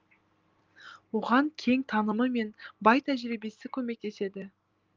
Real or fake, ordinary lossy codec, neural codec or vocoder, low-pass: real; Opus, 32 kbps; none; 7.2 kHz